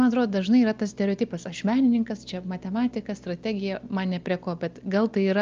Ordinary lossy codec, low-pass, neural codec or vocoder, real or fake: Opus, 24 kbps; 7.2 kHz; none; real